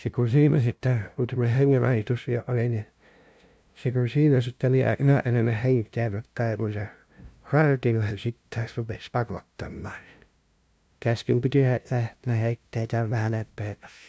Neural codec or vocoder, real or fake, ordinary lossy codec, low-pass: codec, 16 kHz, 0.5 kbps, FunCodec, trained on LibriTTS, 25 frames a second; fake; none; none